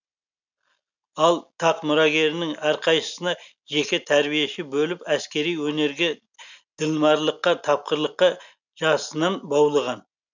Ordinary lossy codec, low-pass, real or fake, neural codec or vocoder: none; 7.2 kHz; real; none